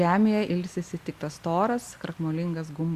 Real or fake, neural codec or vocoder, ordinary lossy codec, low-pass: real; none; Opus, 64 kbps; 14.4 kHz